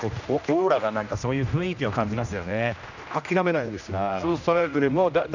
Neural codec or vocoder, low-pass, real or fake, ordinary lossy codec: codec, 16 kHz, 1 kbps, X-Codec, HuBERT features, trained on general audio; 7.2 kHz; fake; none